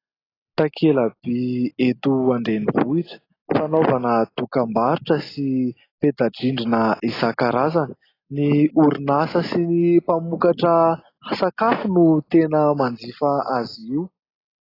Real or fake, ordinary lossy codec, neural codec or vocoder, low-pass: real; AAC, 24 kbps; none; 5.4 kHz